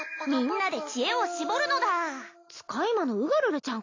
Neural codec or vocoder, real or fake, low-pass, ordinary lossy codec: none; real; 7.2 kHz; AAC, 32 kbps